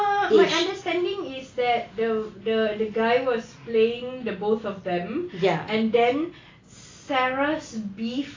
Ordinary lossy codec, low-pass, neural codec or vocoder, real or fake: AAC, 32 kbps; 7.2 kHz; none; real